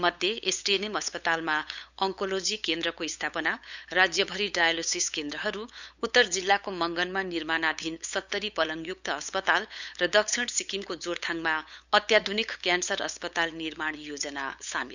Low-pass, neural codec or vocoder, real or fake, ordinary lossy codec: 7.2 kHz; codec, 16 kHz, 8 kbps, FunCodec, trained on LibriTTS, 25 frames a second; fake; none